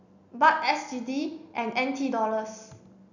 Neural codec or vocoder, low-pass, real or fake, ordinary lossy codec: none; 7.2 kHz; real; none